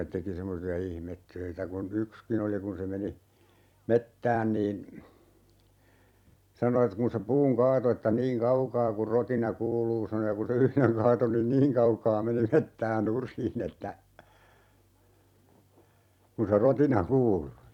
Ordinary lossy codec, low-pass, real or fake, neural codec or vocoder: none; 19.8 kHz; fake; vocoder, 44.1 kHz, 128 mel bands every 256 samples, BigVGAN v2